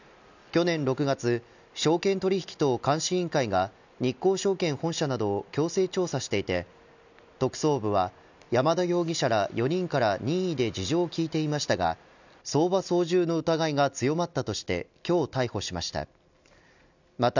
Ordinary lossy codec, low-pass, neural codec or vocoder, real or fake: none; 7.2 kHz; none; real